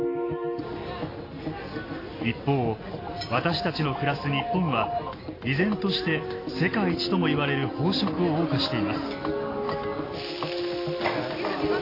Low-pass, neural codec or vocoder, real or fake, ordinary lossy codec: 5.4 kHz; none; real; AAC, 24 kbps